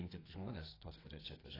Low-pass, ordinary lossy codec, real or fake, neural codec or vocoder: 5.4 kHz; AAC, 24 kbps; fake; codec, 32 kHz, 1.9 kbps, SNAC